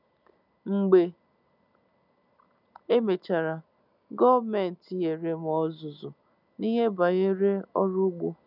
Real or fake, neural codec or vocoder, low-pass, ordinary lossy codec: real; none; 5.4 kHz; none